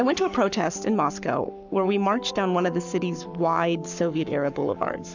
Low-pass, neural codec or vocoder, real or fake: 7.2 kHz; codec, 44.1 kHz, 7.8 kbps, Pupu-Codec; fake